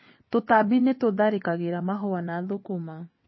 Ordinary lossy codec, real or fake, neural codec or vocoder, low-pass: MP3, 24 kbps; fake; vocoder, 22.05 kHz, 80 mel bands, WaveNeXt; 7.2 kHz